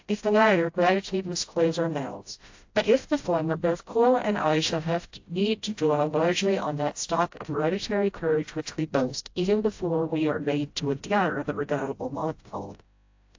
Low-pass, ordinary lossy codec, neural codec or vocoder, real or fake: 7.2 kHz; AAC, 48 kbps; codec, 16 kHz, 0.5 kbps, FreqCodec, smaller model; fake